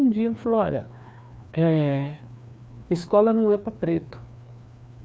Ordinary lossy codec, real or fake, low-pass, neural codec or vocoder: none; fake; none; codec, 16 kHz, 2 kbps, FreqCodec, larger model